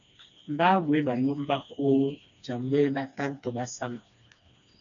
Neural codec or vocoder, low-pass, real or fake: codec, 16 kHz, 2 kbps, FreqCodec, smaller model; 7.2 kHz; fake